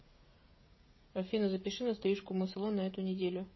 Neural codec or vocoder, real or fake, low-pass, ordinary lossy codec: codec, 16 kHz, 16 kbps, FreqCodec, smaller model; fake; 7.2 kHz; MP3, 24 kbps